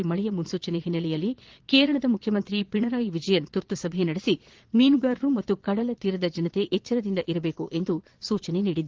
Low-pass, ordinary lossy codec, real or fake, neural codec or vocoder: 7.2 kHz; Opus, 16 kbps; fake; vocoder, 22.05 kHz, 80 mel bands, Vocos